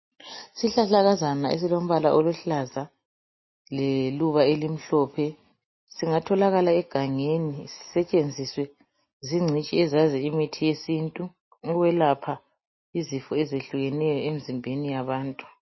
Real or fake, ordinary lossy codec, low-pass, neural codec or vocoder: real; MP3, 24 kbps; 7.2 kHz; none